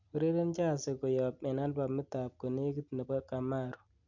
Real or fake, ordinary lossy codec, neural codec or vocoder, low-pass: real; none; none; 7.2 kHz